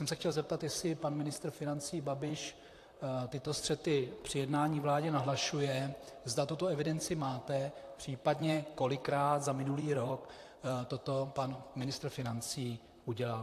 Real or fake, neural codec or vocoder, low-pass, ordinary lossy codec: fake; vocoder, 44.1 kHz, 128 mel bands, Pupu-Vocoder; 14.4 kHz; AAC, 64 kbps